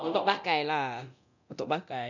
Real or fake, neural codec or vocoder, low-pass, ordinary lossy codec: fake; codec, 24 kHz, 0.9 kbps, DualCodec; 7.2 kHz; none